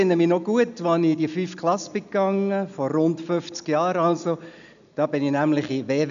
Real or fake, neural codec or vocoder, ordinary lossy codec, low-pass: real; none; none; 7.2 kHz